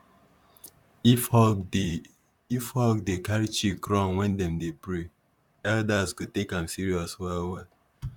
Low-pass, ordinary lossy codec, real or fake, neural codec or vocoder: 19.8 kHz; none; fake; vocoder, 44.1 kHz, 128 mel bands, Pupu-Vocoder